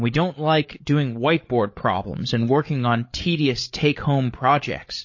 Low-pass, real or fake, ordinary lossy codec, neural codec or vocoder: 7.2 kHz; fake; MP3, 32 kbps; codec, 16 kHz, 8 kbps, FreqCodec, larger model